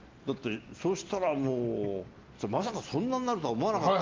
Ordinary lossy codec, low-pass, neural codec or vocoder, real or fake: Opus, 32 kbps; 7.2 kHz; none; real